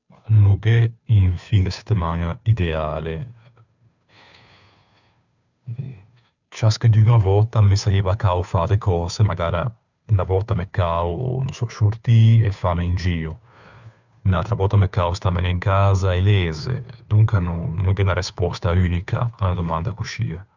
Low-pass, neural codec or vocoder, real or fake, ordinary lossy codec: 7.2 kHz; codec, 16 kHz, 2 kbps, FunCodec, trained on Chinese and English, 25 frames a second; fake; none